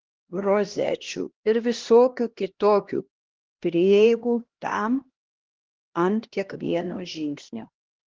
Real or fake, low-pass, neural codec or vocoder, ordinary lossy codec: fake; 7.2 kHz; codec, 16 kHz, 1 kbps, X-Codec, HuBERT features, trained on LibriSpeech; Opus, 16 kbps